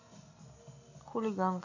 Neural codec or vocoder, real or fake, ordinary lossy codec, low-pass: none; real; none; 7.2 kHz